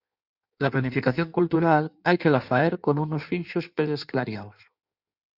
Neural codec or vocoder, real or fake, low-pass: codec, 16 kHz in and 24 kHz out, 1.1 kbps, FireRedTTS-2 codec; fake; 5.4 kHz